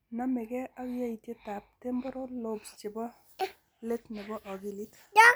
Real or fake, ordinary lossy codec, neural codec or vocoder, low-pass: real; none; none; none